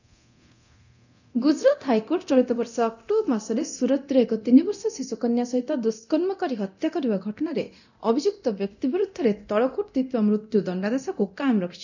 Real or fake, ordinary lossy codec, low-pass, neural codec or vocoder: fake; none; 7.2 kHz; codec, 24 kHz, 0.9 kbps, DualCodec